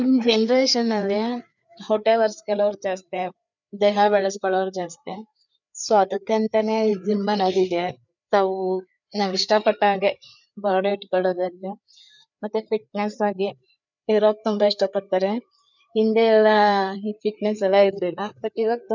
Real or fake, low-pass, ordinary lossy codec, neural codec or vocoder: fake; 7.2 kHz; none; codec, 16 kHz, 4 kbps, FreqCodec, larger model